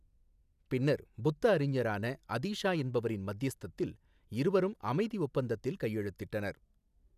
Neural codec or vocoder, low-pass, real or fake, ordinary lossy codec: none; 14.4 kHz; real; none